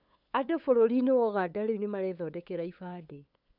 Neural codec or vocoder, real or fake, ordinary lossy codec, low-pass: codec, 16 kHz, 8 kbps, FunCodec, trained on LibriTTS, 25 frames a second; fake; none; 5.4 kHz